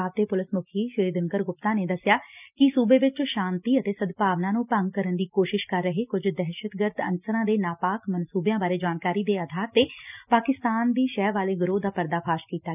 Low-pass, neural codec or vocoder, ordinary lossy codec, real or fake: 3.6 kHz; none; none; real